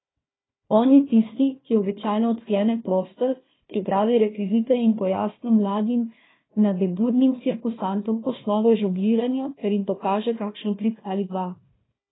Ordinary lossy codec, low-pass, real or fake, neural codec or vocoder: AAC, 16 kbps; 7.2 kHz; fake; codec, 16 kHz, 1 kbps, FunCodec, trained on Chinese and English, 50 frames a second